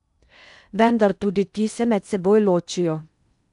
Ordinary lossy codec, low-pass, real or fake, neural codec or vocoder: none; 10.8 kHz; fake; codec, 16 kHz in and 24 kHz out, 0.8 kbps, FocalCodec, streaming, 65536 codes